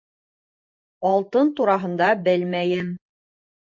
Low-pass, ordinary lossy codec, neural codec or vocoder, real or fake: 7.2 kHz; MP3, 48 kbps; vocoder, 44.1 kHz, 128 mel bands every 512 samples, BigVGAN v2; fake